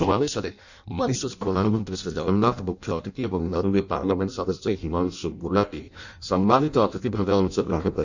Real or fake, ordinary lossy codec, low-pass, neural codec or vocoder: fake; none; 7.2 kHz; codec, 16 kHz in and 24 kHz out, 0.6 kbps, FireRedTTS-2 codec